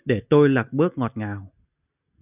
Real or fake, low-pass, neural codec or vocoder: real; 3.6 kHz; none